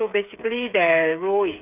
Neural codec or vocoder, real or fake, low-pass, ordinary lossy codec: codec, 16 kHz, 8 kbps, FreqCodec, smaller model; fake; 3.6 kHz; none